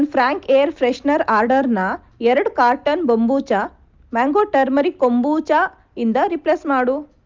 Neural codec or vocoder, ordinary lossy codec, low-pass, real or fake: none; Opus, 32 kbps; 7.2 kHz; real